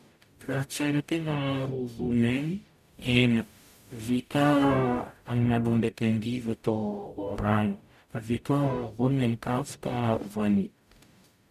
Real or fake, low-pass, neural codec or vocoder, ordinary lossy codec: fake; 14.4 kHz; codec, 44.1 kHz, 0.9 kbps, DAC; none